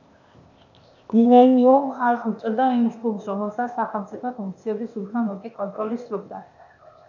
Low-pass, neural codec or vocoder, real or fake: 7.2 kHz; codec, 16 kHz, 0.8 kbps, ZipCodec; fake